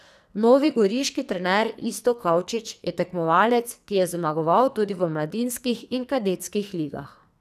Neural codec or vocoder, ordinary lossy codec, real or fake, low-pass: codec, 44.1 kHz, 2.6 kbps, SNAC; none; fake; 14.4 kHz